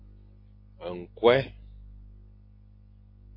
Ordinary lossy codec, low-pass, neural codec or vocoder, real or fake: MP3, 32 kbps; 5.4 kHz; none; real